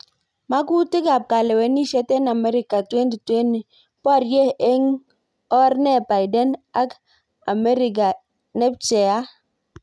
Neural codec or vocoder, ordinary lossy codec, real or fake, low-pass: none; none; real; none